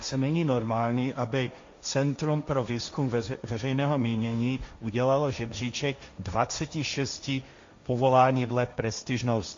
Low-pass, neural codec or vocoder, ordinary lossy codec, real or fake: 7.2 kHz; codec, 16 kHz, 1.1 kbps, Voila-Tokenizer; MP3, 48 kbps; fake